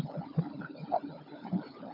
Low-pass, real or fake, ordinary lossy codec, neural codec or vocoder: 5.4 kHz; fake; AAC, 24 kbps; codec, 16 kHz, 16 kbps, FunCodec, trained on LibriTTS, 50 frames a second